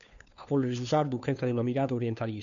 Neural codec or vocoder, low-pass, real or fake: codec, 16 kHz, 2 kbps, FunCodec, trained on Chinese and English, 25 frames a second; 7.2 kHz; fake